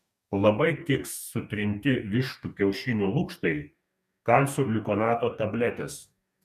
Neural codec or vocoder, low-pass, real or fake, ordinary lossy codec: codec, 44.1 kHz, 2.6 kbps, DAC; 14.4 kHz; fake; MP3, 96 kbps